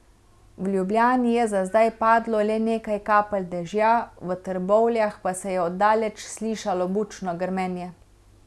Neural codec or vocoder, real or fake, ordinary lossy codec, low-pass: none; real; none; none